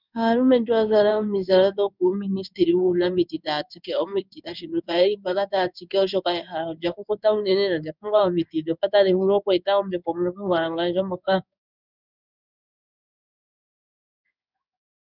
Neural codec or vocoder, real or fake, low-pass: codec, 16 kHz in and 24 kHz out, 1 kbps, XY-Tokenizer; fake; 5.4 kHz